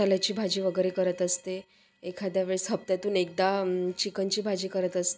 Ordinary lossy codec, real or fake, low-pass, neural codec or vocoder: none; real; none; none